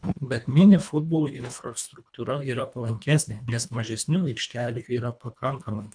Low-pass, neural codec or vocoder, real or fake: 9.9 kHz; codec, 24 kHz, 1.5 kbps, HILCodec; fake